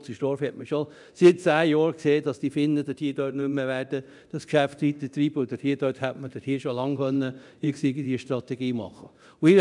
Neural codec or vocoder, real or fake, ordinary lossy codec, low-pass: codec, 24 kHz, 0.9 kbps, DualCodec; fake; none; 10.8 kHz